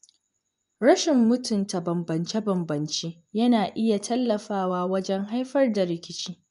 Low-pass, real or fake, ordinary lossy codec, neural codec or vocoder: 10.8 kHz; fake; none; vocoder, 24 kHz, 100 mel bands, Vocos